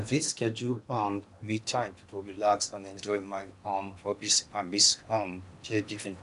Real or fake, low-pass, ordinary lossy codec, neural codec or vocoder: fake; 10.8 kHz; none; codec, 16 kHz in and 24 kHz out, 0.8 kbps, FocalCodec, streaming, 65536 codes